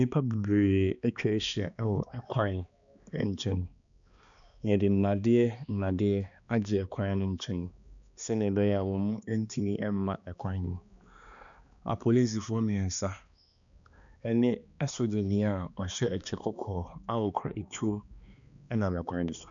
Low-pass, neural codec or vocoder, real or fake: 7.2 kHz; codec, 16 kHz, 2 kbps, X-Codec, HuBERT features, trained on balanced general audio; fake